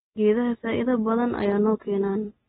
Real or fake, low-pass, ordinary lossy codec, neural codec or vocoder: real; 7.2 kHz; AAC, 16 kbps; none